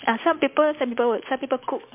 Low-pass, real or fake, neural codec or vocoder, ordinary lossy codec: 3.6 kHz; real; none; MP3, 32 kbps